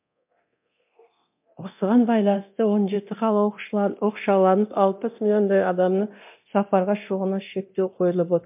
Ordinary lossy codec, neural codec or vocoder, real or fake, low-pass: MP3, 32 kbps; codec, 24 kHz, 0.9 kbps, DualCodec; fake; 3.6 kHz